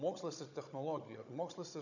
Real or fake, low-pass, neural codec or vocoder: fake; 7.2 kHz; codec, 16 kHz, 16 kbps, FunCodec, trained on Chinese and English, 50 frames a second